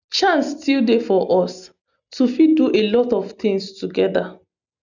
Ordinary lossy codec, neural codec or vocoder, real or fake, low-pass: none; none; real; 7.2 kHz